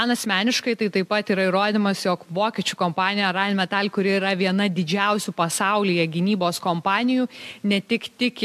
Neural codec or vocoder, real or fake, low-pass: none; real; 14.4 kHz